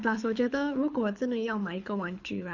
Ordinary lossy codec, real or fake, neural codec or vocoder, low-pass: none; fake; codec, 24 kHz, 6 kbps, HILCodec; 7.2 kHz